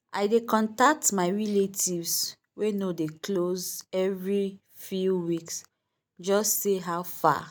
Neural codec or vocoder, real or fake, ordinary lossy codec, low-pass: none; real; none; none